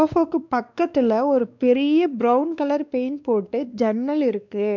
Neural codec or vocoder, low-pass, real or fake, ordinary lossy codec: codec, 16 kHz, 2 kbps, X-Codec, WavLM features, trained on Multilingual LibriSpeech; 7.2 kHz; fake; none